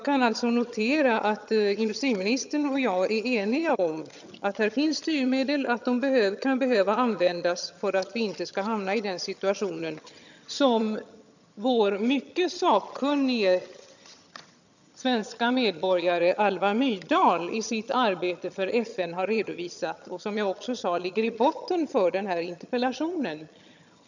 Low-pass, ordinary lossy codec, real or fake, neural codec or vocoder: 7.2 kHz; none; fake; vocoder, 22.05 kHz, 80 mel bands, HiFi-GAN